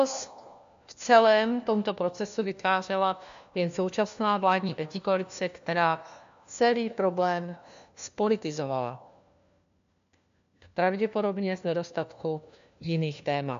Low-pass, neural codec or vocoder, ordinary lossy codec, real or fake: 7.2 kHz; codec, 16 kHz, 1 kbps, FunCodec, trained on LibriTTS, 50 frames a second; MP3, 64 kbps; fake